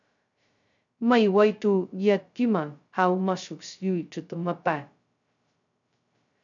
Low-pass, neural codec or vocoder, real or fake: 7.2 kHz; codec, 16 kHz, 0.2 kbps, FocalCodec; fake